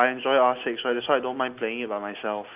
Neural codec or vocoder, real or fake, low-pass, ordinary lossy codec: none; real; 3.6 kHz; Opus, 24 kbps